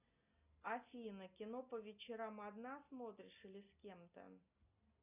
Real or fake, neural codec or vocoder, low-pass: real; none; 3.6 kHz